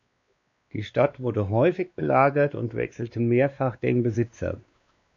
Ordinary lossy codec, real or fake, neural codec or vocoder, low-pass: AAC, 64 kbps; fake; codec, 16 kHz, 2 kbps, X-Codec, WavLM features, trained on Multilingual LibriSpeech; 7.2 kHz